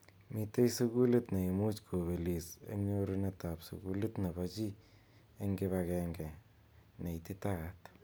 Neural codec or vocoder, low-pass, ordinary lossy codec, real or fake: none; none; none; real